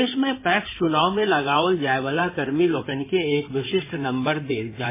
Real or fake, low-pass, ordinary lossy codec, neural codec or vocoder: fake; 3.6 kHz; MP3, 16 kbps; codec, 16 kHz in and 24 kHz out, 2.2 kbps, FireRedTTS-2 codec